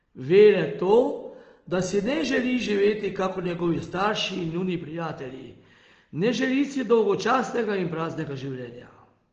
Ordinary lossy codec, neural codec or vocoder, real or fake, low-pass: Opus, 16 kbps; none; real; 7.2 kHz